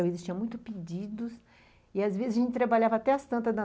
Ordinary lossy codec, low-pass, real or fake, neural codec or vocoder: none; none; real; none